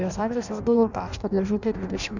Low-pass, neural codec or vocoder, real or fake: 7.2 kHz; codec, 16 kHz in and 24 kHz out, 0.6 kbps, FireRedTTS-2 codec; fake